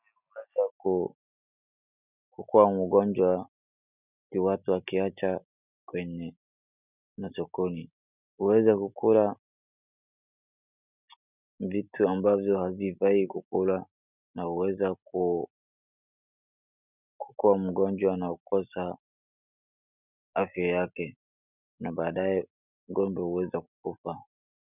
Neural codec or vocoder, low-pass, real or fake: none; 3.6 kHz; real